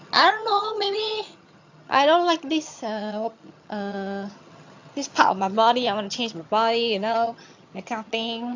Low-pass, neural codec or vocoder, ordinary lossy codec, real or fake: 7.2 kHz; vocoder, 22.05 kHz, 80 mel bands, HiFi-GAN; AAC, 48 kbps; fake